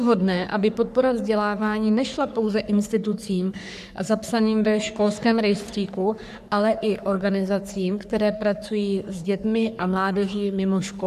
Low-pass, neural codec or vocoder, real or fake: 14.4 kHz; codec, 44.1 kHz, 3.4 kbps, Pupu-Codec; fake